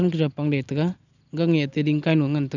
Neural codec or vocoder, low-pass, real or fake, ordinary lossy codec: none; 7.2 kHz; real; none